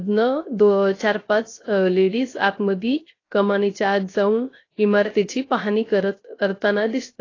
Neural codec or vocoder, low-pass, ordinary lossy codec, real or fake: codec, 16 kHz, 0.3 kbps, FocalCodec; 7.2 kHz; AAC, 32 kbps; fake